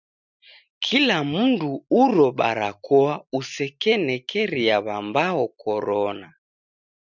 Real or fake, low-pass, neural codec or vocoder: real; 7.2 kHz; none